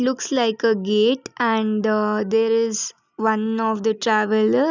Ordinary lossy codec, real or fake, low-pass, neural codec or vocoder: none; real; 7.2 kHz; none